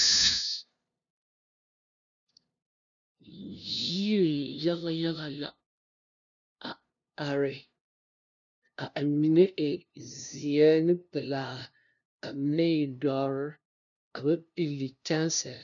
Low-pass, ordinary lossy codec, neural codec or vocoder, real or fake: 7.2 kHz; MP3, 96 kbps; codec, 16 kHz, 0.5 kbps, FunCodec, trained on LibriTTS, 25 frames a second; fake